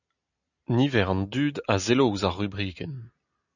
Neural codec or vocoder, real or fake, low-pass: none; real; 7.2 kHz